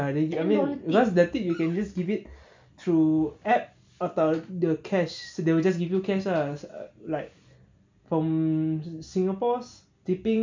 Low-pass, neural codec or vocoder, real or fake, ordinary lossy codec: 7.2 kHz; none; real; MP3, 64 kbps